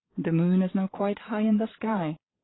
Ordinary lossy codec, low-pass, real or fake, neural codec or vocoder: AAC, 16 kbps; 7.2 kHz; fake; vocoder, 22.05 kHz, 80 mel bands, Vocos